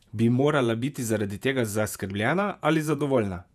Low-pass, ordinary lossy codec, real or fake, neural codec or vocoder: 14.4 kHz; none; fake; vocoder, 48 kHz, 128 mel bands, Vocos